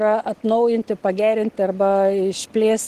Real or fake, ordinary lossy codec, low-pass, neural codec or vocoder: real; Opus, 16 kbps; 14.4 kHz; none